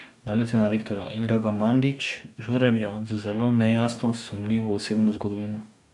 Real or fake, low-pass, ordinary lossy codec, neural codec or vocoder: fake; 10.8 kHz; none; codec, 44.1 kHz, 2.6 kbps, DAC